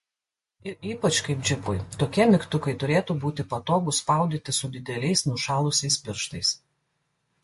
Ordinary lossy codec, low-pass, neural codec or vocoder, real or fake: MP3, 48 kbps; 14.4 kHz; none; real